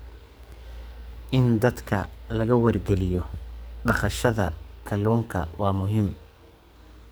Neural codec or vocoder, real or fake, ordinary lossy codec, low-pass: codec, 44.1 kHz, 2.6 kbps, SNAC; fake; none; none